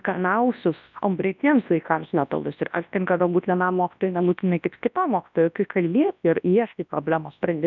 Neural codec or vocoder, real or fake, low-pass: codec, 24 kHz, 0.9 kbps, WavTokenizer, large speech release; fake; 7.2 kHz